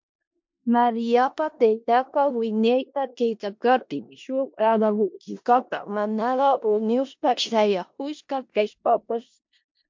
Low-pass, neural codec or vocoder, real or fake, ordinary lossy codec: 7.2 kHz; codec, 16 kHz in and 24 kHz out, 0.4 kbps, LongCat-Audio-Codec, four codebook decoder; fake; MP3, 48 kbps